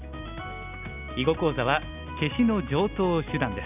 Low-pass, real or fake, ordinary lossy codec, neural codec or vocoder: 3.6 kHz; real; none; none